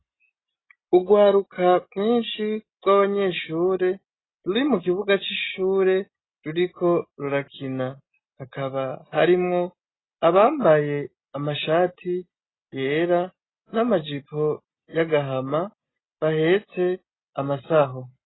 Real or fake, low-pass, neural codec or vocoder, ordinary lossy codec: real; 7.2 kHz; none; AAC, 16 kbps